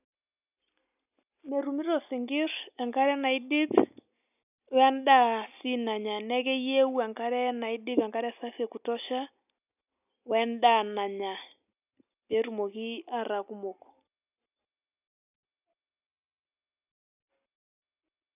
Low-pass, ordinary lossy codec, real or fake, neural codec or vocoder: 3.6 kHz; none; real; none